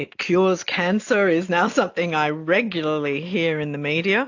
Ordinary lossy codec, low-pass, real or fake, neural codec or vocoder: AAC, 48 kbps; 7.2 kHz; real; none